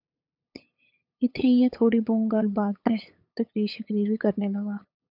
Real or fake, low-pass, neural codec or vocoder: fake; 5.4 kHz; codec, 16 kHz, 8 kbps, FunCodec, trained on LibriTTS, 25 frames a second